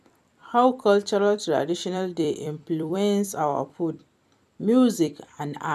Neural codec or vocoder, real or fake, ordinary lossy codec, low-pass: vocoder, 44.1 kHz, 128 mel bands every 256 samples, BigVGAN v2; fake; none; 14.4 kHz